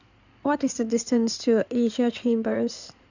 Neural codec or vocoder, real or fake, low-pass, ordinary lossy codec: codec, 16 kHz in and 24 kHz out, 2.2 kbps, FireRedTTS-2 codec; fake; 7.2 kHz; none